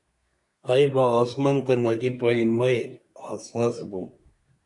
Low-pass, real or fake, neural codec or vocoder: 10.8 kHz; fake; codec, 24 kHz, 1 kbps, SNAC